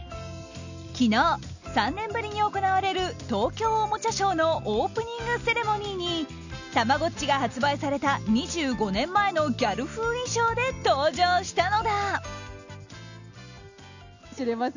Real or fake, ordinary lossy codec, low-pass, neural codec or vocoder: real; none; 7.2 kHz; none